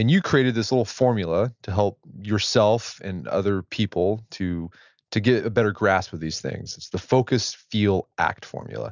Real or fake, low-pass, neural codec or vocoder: real; 7.2 kHz; none